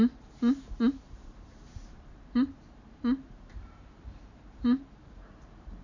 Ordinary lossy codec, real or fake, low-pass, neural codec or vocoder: none; fake; 7.2 kHz; vocoder, 44.1 kHz, 128 mel bands every 512 samples, BigVGAN v2